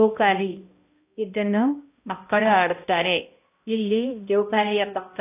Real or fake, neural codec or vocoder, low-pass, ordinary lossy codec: fake; codec, 16 kHz, 0.5 kbps, X-Codec, HuBERT features, trained on balanced general audio; 3.6 kHz; AAC, 24 kbps